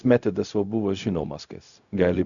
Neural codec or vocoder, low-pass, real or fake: codec, 16 kHz, 0.4 kbps, LongCat-Audio-Codec; 7.2 kHz; fake